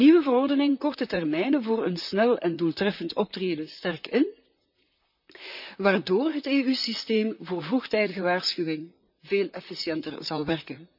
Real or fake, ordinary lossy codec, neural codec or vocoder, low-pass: fake; none; vocoder, 44.1 kHz, 128 mel bands, Pupu-Vocoder; 5.4 kHz